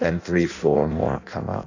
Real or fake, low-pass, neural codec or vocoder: fake; 7.2 kHz; codec, 16 kHz in and 24 kHz out, 0.6 kbps, FireRedTTS-2 codec